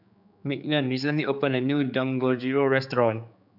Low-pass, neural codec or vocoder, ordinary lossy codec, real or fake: 5.4 kHz; codec, 16 kHz, 4 kbps, X-Codec, HuBERT features, trained on general audio; none; fake